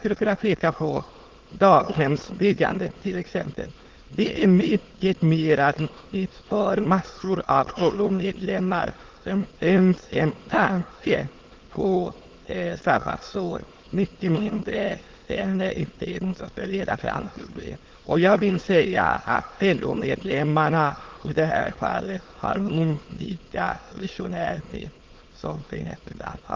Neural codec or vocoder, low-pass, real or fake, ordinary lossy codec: autoencoder, 22.05 kHz, a latent of 192 numbers a frame, VITS, trained on many speakers; 7.2 kHz; fake; Opus, 16 kbps